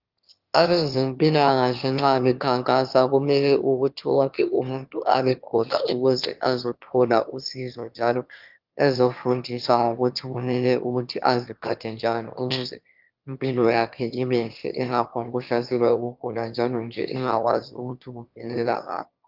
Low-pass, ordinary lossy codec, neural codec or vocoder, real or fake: 5.4 kHz; Opus, 24 kbps; autoencoder, 22.05 kHz, a latent of 192 numbers a frame, VITS, trained on one speaker; fake